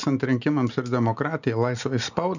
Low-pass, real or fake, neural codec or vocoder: 7.2 kHz; real; none